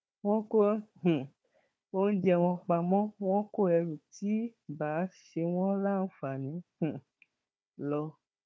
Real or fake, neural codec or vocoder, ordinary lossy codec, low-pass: fake; codec, 16 kHz, 4 kbps, FunCodec, trained on Chinese and English, 50 frames a second; none; none